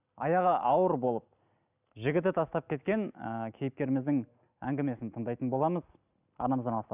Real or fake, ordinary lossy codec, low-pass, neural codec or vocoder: real; none; 3.6 kHz; none